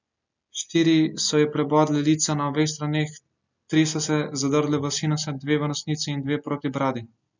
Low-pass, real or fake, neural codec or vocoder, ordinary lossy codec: 7.2 kHz; real; none; none